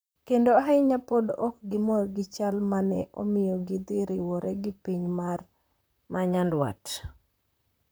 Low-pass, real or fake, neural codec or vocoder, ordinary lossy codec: none; fake; vocoder, 44.1 kHz, 128 mel bands, Pupu-Vocoder; none